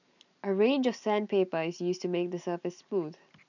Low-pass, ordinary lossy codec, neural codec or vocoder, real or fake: 7.2 kHz; none; none; real